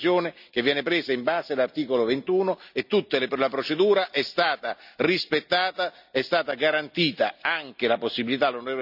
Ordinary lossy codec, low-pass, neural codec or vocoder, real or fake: none; 5.4 kHz; none; real